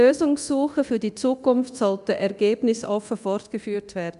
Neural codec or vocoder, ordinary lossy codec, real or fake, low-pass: codec, 24 kHz, 0.9 kbps, DualCodec; none; fake; 10.8 kHz